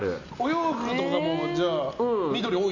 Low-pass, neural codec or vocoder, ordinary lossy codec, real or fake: 7.2 kHz; none; none; real